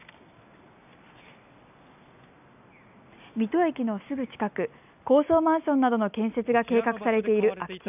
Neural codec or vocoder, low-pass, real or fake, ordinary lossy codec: none; 3.6 kHz; real; none